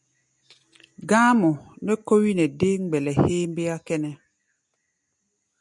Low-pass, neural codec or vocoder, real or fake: 10.8 kHz; none; real